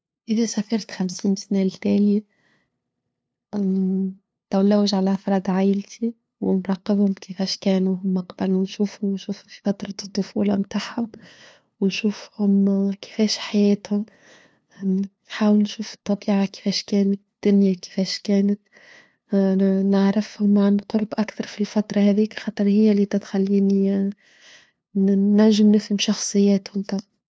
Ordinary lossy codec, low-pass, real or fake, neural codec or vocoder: none; none; fake; codec, 16 kHz, 2 kbps, FunCodec, trained on LibriTTS, 25 frames a second